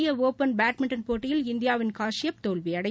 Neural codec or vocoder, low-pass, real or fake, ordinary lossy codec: none; none; real; none